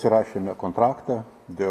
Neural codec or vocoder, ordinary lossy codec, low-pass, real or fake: none; AAC, 48 kbps; 14.4 kHz; real